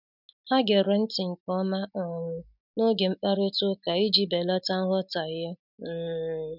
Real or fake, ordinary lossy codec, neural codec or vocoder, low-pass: real; none; none; 5.4 kHz